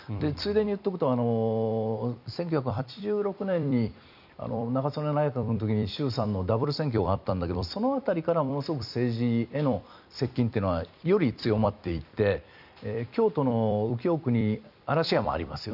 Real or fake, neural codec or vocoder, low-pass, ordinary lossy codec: fake; vocoder, 44.1 kHz, 128 mel bands every 256 samples, BigVGAN v2; 5.4 kHz; none